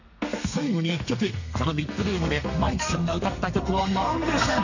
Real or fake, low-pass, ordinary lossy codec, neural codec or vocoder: fake; 7.2 kHz; none; codec, 32 kHz, 1.9 kbps, SNAC